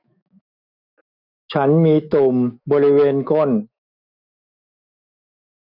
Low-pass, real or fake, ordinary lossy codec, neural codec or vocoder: 5.4 kHz; fake; none; autoencoder, 48 kHz, 128 numbers a frame, DAC-VAE, trained on Japanese speech